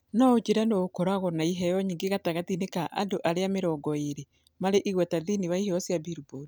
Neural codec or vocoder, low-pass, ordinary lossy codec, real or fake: none; none; none; real